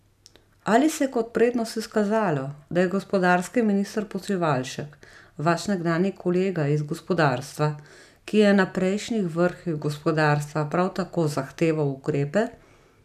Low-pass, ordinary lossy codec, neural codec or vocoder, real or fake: 14.4 kHz; none; none; real